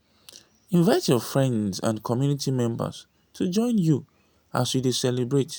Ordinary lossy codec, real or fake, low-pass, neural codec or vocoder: none; real; none; none